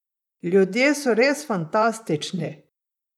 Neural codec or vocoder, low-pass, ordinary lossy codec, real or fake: vocoder, 44.1 kHz, 128 mel bands, Pupu-Vocoder; 19.8 kHz; none; fake